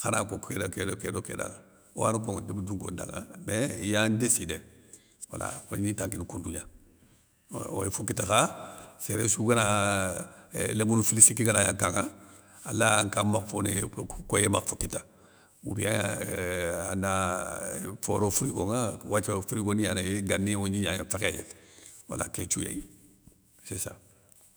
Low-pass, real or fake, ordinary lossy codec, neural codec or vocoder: none; fake; none; autoencoder, 48 kHz, 128 numbers a frame, DAC-VAE, trained on Japanese speech